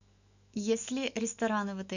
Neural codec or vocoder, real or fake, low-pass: autoencoder, 48 kHz, 128 numbers a frame, DAC-VAE, trained on Japanese speech; fake; 7.2 kHz